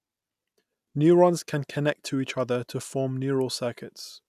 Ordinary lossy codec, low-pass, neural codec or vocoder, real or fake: none; 14.4 kHz; none; real